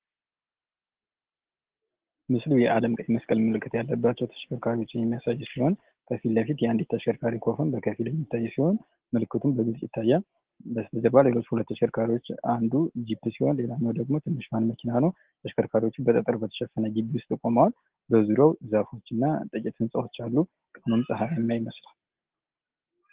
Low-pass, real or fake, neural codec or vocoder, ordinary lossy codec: 3.6 kHz; real; none; Opus, 16 kbps